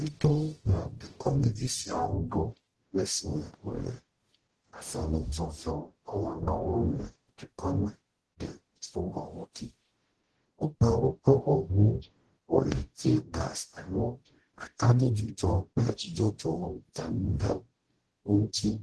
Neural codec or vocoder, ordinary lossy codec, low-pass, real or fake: codec, 44.1 kHz, 0.9 kbps, DAC; Opus, 16 kbps; 10.8 kHz; fake